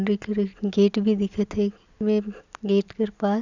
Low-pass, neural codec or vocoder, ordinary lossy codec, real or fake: 7.2 kHz; none; none; real